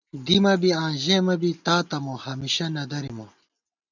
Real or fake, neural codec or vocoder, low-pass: real; none; 7.2 kHz